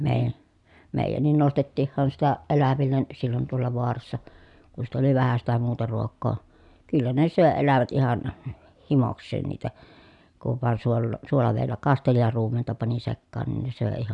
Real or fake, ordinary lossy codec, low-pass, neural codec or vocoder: real; none; 10.8 kHz; none